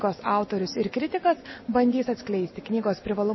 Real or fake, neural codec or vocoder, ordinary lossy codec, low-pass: real; none; MP3, 24 kbps; 7.2 kHz